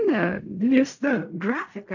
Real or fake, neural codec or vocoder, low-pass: fake; codec, 16 kHz in and 24 kHz out, 0.4 kbps, LongCat-Audio-Codec, fine tuned four codebook decoder; 7.2 kHz